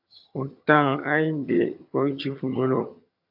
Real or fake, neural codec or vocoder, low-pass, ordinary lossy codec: fake; vocoder, 22.05 kHz, 80 mel bands, HiFi-GAN; 5.4 kHz; AAC, 48 kbps